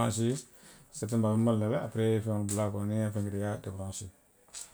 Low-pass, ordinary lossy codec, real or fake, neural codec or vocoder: none; none; real; none